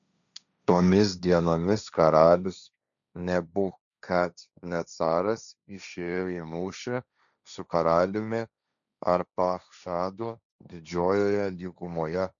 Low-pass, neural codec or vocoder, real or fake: 7.2 kHz; codec, 16 kHz, 1.1 kbps, Voila-Tokenizer; fake